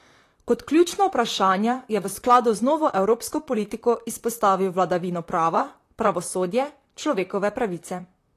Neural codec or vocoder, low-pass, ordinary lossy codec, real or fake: vocoder, 44.1 kHz, 128 mel bands, Pupu-Vocoder; 14.4 kHz; AAC, 48 kbps; fake